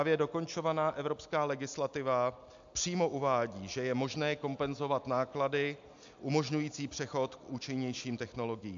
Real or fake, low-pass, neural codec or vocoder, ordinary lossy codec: real; 7.2 kHz; none; AAC, 64 kbps